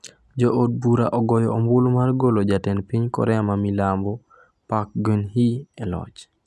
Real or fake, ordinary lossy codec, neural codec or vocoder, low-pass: real; none; none; none